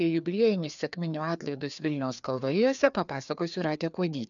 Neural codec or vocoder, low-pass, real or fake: codec, 16 kHz, 2 kbps, FreqCodec, larger model; 7.2 kHz; fake